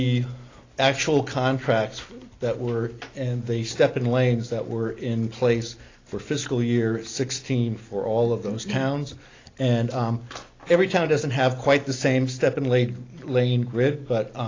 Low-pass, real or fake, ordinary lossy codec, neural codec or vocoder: 7.2 kHz; real; AAC, 32 kbps; none